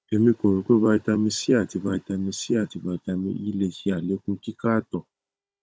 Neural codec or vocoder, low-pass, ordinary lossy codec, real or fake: codec, 16 kHz, 16 kbps, FunCodec, trained on Chinese and English, 50 frames a second; none; none; fake